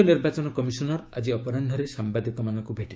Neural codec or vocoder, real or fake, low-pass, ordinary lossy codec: codec, 16 kHz, 6 kbps, DAC; fake; none; none